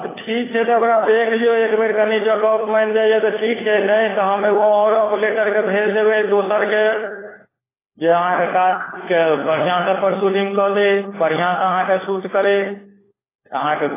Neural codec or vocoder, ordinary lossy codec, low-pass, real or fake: codec, 16 kHz, 4 kbps, FunCodec, trained on LibriTTS, 50 frames a second; AAC, 16 kbps; 3.6 kHz; fake